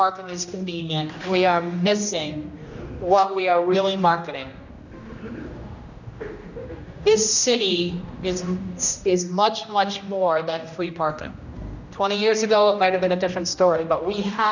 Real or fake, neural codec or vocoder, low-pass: fake; codec, 16 kHz, 1 kbps, X-Codec, HuBERT features, trained on general audio; 7.2 kHz